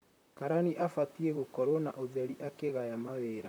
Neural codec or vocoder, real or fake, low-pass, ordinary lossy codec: vocoder, 44.1 kHz, 128 mel bands, Pupu-Vocoder; fake; none; none